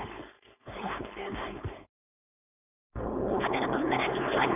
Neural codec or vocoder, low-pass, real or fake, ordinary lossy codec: codec, 16 kHz, 4.8 kbps, FACodec; 3.6 kHz; fake; none